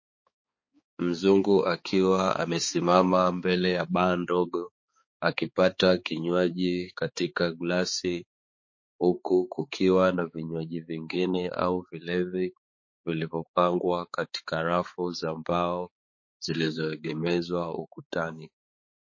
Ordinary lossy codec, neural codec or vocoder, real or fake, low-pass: MP3, 32 kbps; codec, 16 kHz, 4 kbps, X-Codec, HuBERT features, trained on balanced general audio; fake; 7.2 kHz